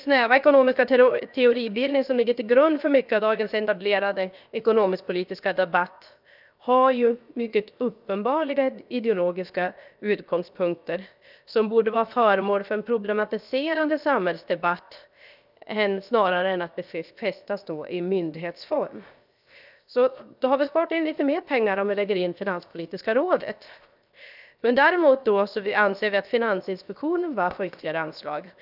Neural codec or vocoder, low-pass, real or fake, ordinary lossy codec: codec, 16 kHz, 0.7 kbps, FocalCodec; 5.4 kHz; fake; none